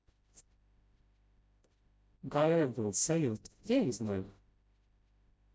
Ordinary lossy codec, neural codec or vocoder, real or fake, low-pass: none; codec, 16 kHz, 0.5 kbps, FreqCodec, smaller model; fake; none